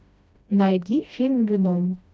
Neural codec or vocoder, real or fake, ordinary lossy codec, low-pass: codec, 16 kHz, 1 kbps, FreqCodec, smaller model; fake; none; none